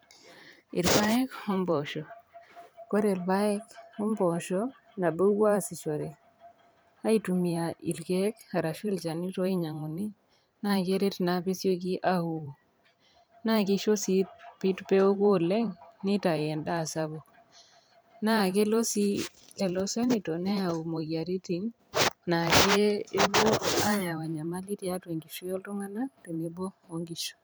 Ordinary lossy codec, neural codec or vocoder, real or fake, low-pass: none; vocoder, 44.1 kHz, 128 mel bands every 512 samples, BigVGAN v2; fake; none